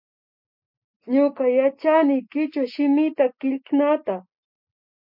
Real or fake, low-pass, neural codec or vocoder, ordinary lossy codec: real; 5.4 kHz; none; MP3, 48 kbps